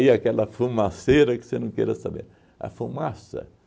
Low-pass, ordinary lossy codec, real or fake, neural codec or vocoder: none; none; real; none